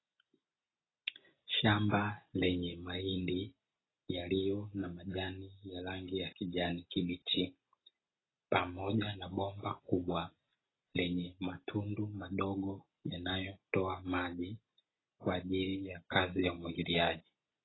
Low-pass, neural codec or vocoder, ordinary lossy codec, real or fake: 7.2 kHz; none; AAC, 16 kbps; real